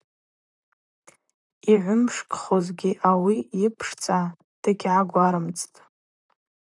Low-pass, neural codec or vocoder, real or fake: 10.8 kHz; vocoder, 44.1 kHz, 128 mel bands, Pupu-Vocoder; fake